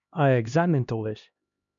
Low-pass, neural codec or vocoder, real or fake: 7.2 kHz; codec, 16 kHz, 1 kbps, X-Codec, HuBERT features, trained on LibriSpeech; fake